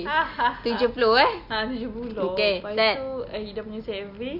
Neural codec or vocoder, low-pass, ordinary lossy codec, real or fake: none; 5.4 kHz; none; real